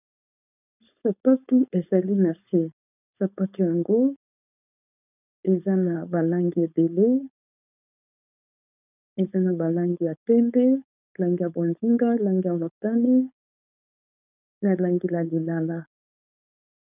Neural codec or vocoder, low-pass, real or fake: codec, 16 kHz, 4.8 kbps, FACodec; 3.6 kHz; fake